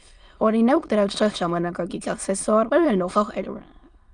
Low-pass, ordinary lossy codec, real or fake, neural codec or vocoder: 9.9 kHz; Opus, 32 kbps; fake; autoencoder, 22.05 kHz, a latent of 192 numbers a frame, VITS, trained on many speakers